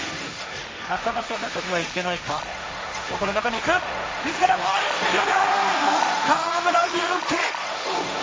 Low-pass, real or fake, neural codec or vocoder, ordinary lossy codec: none; fake; codec, 16 kHz, 1.1 kbps, Voila-Tokenizer; none